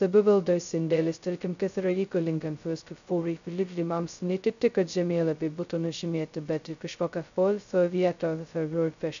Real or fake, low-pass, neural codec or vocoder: fake; 7.2 kHz; codec, 16 kHz, 0.2 kbps, FocalCodec